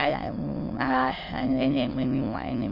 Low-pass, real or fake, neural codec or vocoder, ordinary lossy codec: 5.4 kHz; fake; autoencoder, 22.05 kHz, a latent of 192 numbers a frame, VITS, trained on many speakers; MP3, 32 kbps